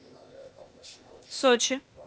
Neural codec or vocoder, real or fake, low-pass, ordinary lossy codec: codec, 16 kHz, 0.8 kbps, ZipCodec; fake; none; none